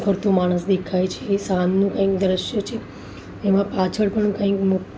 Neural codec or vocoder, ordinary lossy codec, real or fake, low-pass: none; none; real; none